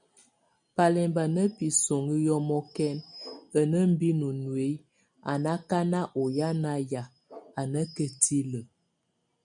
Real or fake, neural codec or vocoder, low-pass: real; none; 9.9 kHz